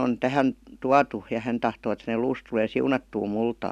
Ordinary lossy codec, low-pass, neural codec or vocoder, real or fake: none; 14.4 kHz; none; real